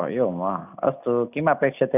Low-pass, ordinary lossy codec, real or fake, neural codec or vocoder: 3.6 kHz; none; real; none